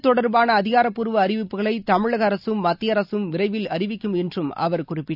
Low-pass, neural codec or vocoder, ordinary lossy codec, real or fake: 5.4 kHz; none; none; real